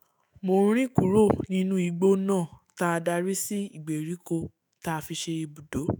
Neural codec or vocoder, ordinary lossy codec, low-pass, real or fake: autoencoder, 48 kHz, 128 numbers a frame, DAC-VAE, trained on Japanese speech; none; none; fake